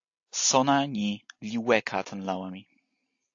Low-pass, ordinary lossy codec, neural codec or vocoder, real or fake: 7.2 kHz; AAC, 48 kbps; none; real